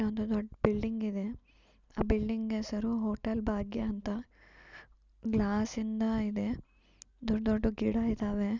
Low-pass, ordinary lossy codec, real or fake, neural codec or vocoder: 7.2 kHz; none; real; none